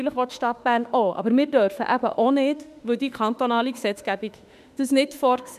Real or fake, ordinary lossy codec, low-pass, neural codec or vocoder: fake; none; 14.4 kHz; autoencoder, 48 kHz, 32 numbers a frame, DAC-VAE, trained on Japanese speech